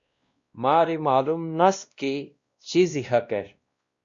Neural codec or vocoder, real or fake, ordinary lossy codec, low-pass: codec, 16 kHz, 1 kbps, X-Codec, WavLM features, trained on Multilingual LibriSpeech; fake; Opus, 64 kbps; 7.2 kHz